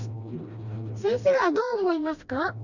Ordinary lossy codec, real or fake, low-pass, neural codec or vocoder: none; fake; 7.2 kHz; codec, 16 kHz, 1 kbps, FreqCodec, smaller model